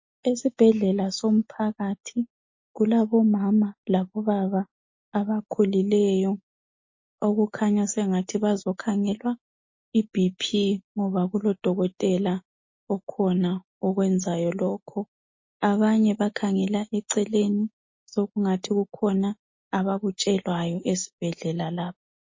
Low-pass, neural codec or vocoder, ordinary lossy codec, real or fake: 7.2 kHz; none; MP3, 32 kbps; real